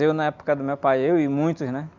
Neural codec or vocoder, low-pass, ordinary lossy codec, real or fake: none; 7.2 kHz; none; real